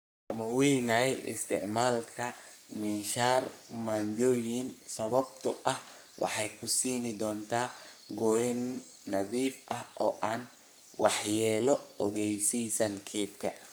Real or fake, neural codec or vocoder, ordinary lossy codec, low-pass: fake; codec, 44.1 kHz, 3.4 kbps, Pupu-Codec; none; none